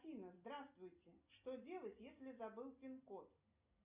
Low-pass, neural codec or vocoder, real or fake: 3.6 kHz; none; real